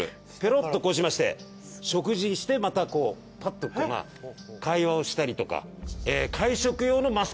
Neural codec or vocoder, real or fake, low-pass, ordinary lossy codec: none; real; none; none